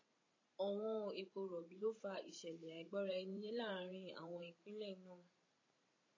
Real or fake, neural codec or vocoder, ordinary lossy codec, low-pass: fake; vocoder, 44.1 kHz, 128 mel bands every 512 samples, BigVGAN v2; AAC, 32 kbps; 7.2 kHz